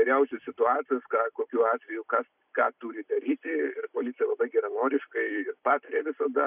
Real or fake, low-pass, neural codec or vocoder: fake; 3.6 kHz; vocoder, 44.1 kHz, 128 mel bands every 256 samples, BigVGAN v2